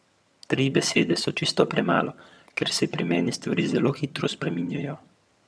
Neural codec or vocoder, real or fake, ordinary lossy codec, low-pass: vocoder, 22.05 kHz, 80 mel bands, HiFi-GAN; fake; none; none